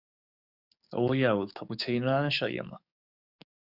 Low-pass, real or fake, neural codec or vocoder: 5.4 kHz; fake; codec, 44.1 kHz, 7.8 kbps, DAC